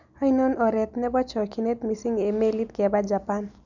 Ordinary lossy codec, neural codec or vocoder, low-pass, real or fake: none; none; 7.2 kHz; real